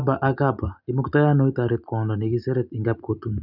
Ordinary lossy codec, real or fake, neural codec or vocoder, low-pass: none; real; none; 5.4 kHz